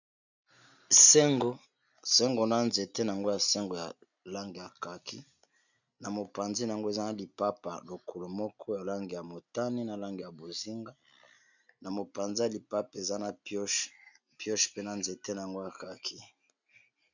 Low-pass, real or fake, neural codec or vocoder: 7.2 kHz; real; none